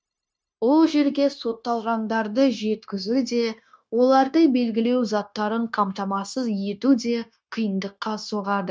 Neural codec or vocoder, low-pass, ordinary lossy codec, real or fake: codec, 16 kHz, 0.9 kbps, LongCat-Audio-Codec; none; none; fake